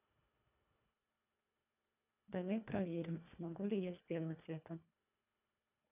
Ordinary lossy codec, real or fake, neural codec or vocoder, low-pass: none; fake; codec, 24 kHz, 1.5 kbps, HILCodec; 3.6 kHz